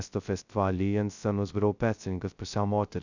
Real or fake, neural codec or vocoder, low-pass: fake; codec, 16 kHz, 0.2 kbps, FocalCodec; 7.2 kHz